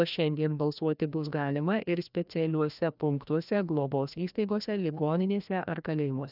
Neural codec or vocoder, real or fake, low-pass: codec, 16 kHz, 1 kbps, FreqCodec, larger model; fake; 5.4 kHz